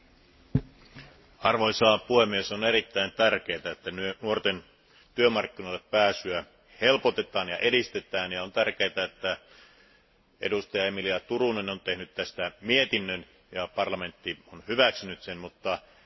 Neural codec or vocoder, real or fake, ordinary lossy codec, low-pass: none; real; MP3, 24 kbps; 7.2 kHz